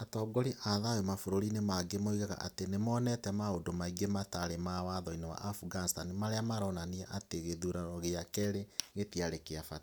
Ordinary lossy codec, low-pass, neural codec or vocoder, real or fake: none; none; none; real